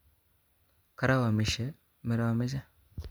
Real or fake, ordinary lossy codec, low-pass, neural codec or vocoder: real; none; none; none